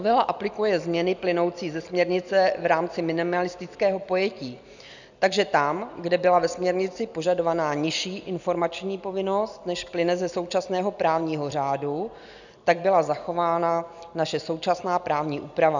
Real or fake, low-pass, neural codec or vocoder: real; 7.2 kHz; none